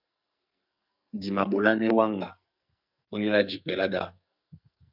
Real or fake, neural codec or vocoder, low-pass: fake; codec, 44.1 kHz, 2.6 kbps, SNAC; 5.4 kHz